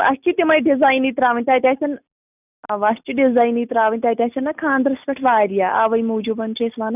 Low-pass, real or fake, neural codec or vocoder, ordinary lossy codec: 3.6 kHz; real; none; none